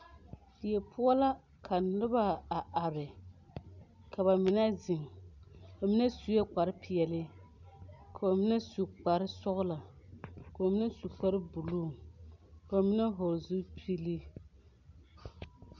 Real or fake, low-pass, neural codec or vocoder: real; 7.2 kHz; none